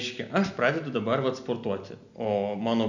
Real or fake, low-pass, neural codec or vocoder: real; 7.2 kHz; none